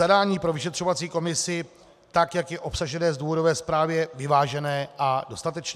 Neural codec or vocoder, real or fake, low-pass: none; real; 14.4 kHz